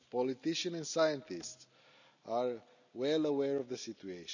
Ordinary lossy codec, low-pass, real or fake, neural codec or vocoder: none; 7.2 kHz; real; none